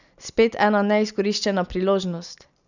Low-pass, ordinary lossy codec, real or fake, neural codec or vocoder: 7.2 kHz; none; real; none